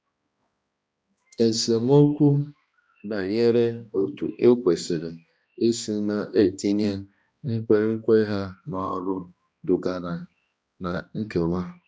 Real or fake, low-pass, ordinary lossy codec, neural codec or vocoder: fake; none; none; codec, 16 kHz, 1 kbps, X-Codec, HuBERT features, trained on balanced general audio